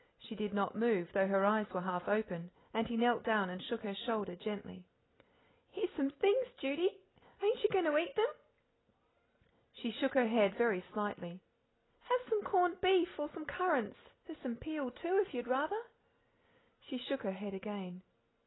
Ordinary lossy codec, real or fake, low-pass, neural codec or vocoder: AAC, 16 kbps; real; 7.2 kHz; none